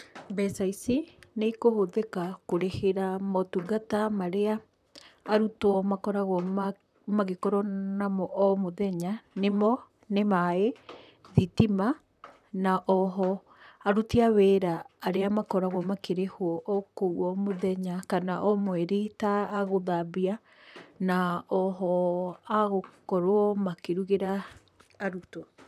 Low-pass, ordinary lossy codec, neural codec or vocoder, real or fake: 14.4 kHz; none; vocoder, 44.1 kHz, 128 mel bands, Pupu-Vocoder; fake